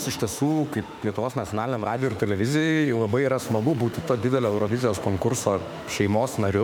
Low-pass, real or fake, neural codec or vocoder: 19.8 kHz; fake; autoencoder, 48 kHz, 32 numbers a frame, DAC-VAE, trained on Japanese speech